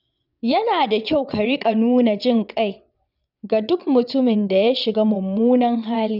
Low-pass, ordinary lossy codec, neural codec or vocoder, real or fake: 5.4 kHz; none; vocoder, 22.05 kHz, 80 mel bands, WaveNeXt; fake